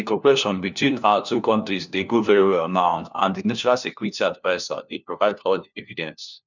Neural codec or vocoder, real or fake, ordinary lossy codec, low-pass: codec, 16 kHz, 1 kbps, FunCodec, trained on LibriTTS, 50 frames a second; fake; none; 7.2 kHz